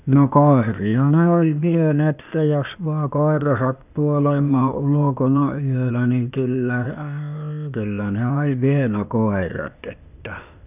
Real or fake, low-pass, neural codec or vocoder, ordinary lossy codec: fake; 3.6 kHz; codec, 16 kHz, 0.8 kbps, ZipCodec; none